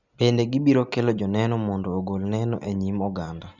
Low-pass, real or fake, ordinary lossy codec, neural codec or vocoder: 7.2 kHz; real; none; none